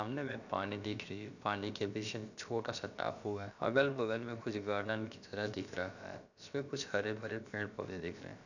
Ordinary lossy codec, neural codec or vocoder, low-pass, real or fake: none; codec, 16 kHz, about 1 kbps, DyCAST, with the encoder's durations; 7.2 kHz; fake